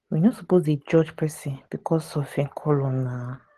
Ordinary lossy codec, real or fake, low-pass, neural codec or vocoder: Opus, 16 kbps; real; 14.4 kHz; none